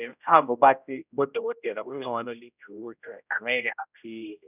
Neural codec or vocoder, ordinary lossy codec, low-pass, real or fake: codec, 16 kHz, 0.5 kbps, X-Codec, HuBERT features, trained on general audio; none; 3.6 kHz; fake